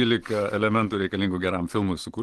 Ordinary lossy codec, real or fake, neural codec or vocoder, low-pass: Opus, 16 kbps; real; none; 10.8 kHz